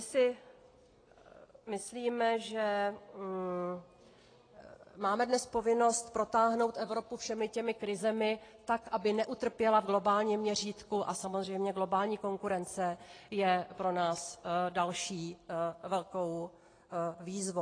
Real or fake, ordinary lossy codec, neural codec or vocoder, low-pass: real; AAC, 32 kbps; none; 9.9 kHz